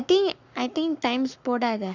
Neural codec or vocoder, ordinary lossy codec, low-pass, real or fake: codec, 44.1 kHz, 7.8 kbps, Pupu-Codec; none; 7.2 kHz; fake